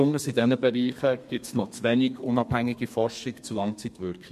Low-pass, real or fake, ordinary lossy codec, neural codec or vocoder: 14.4 kHz; fake; MP3, 64 kbps; codec, 32 kHz, 1.9 kbps, SNAC